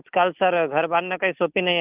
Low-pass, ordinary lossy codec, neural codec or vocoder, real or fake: 3.6 kHz; Opus, 32 kbps; none; real